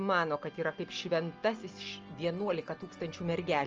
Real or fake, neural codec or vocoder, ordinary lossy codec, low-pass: real; none; Opus, 24 kbps; 7.2 kHz